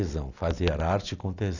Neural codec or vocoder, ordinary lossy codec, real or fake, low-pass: none; none; real; 7.2 kHz